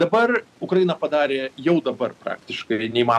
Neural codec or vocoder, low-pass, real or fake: none; 14.4 kHz; real